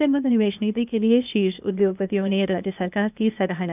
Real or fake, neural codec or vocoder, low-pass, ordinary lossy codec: fake; codec, 16 kHz, 0.8 kbps, ZipCodec; 3.6 kHz; none